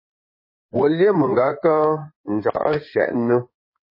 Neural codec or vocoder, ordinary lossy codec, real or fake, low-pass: codec, 16 kHz in and 24 kHz out, 2.2 kbps, FireRedTTS-2 codec; MP3, 24 kbps; fake; 5.4 kHz